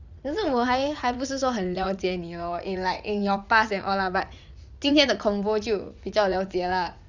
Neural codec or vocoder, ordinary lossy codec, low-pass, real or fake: vocoder, 44.1 kHz, 80 mel bands, Vocos; Opus, 64 kbps; 7.2 kHz; fake